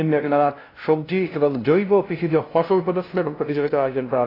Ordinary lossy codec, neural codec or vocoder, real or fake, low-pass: AAC, 24 kbps; codec, 16 kHz, 0.5 kbps, FunCodec, trained on LibriTTS, 25 frames a second; fake; 5.4 kHz